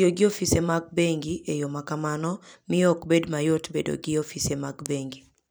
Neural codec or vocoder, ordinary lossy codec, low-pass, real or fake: vocoder, 44.1 kHz, 128 mel bands every 256 samples, BigVGAN v2; none; none; fake